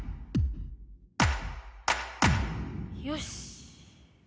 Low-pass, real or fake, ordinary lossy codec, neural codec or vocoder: none; real; none; none